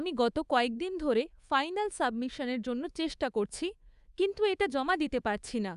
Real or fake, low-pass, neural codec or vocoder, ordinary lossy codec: fake; 10.8 kHz; codec, 24 kHz, 3.1 kbps, DualCodec; Opus, 64 kbps